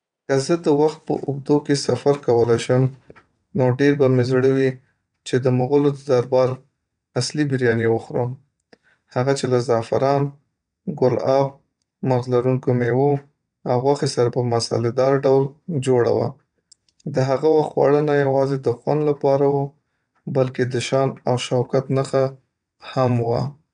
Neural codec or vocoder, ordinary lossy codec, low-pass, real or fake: vocoder, 22.05 kHz, 80 mel bands, WaveNeXt; none; 9.9 kHz; fake